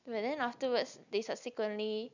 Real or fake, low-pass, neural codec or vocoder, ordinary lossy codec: real; 7.2 kHz; none; none